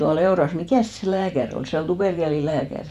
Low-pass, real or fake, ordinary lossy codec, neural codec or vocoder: 14.4 kHz; fake; none; vocoder, 48 kHz, 128 mel bands, Vocos